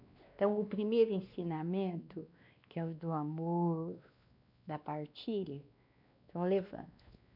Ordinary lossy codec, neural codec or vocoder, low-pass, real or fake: none; codec, 16 kHz, 2 kbps, X-Codec, WavLM features, trained on Multilingual LibriSpeech; 5.4 kHz; fake